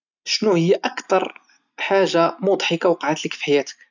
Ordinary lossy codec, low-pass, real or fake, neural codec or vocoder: none; 7.2 kHz; real; none